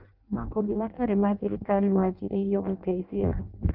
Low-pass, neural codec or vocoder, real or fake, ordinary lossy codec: 5.4 kHz; codec, 16 kHz in and 24 kHz out, 0.6 kbps, FireRedTTS-2 codec; fake; Opus, 24 kbps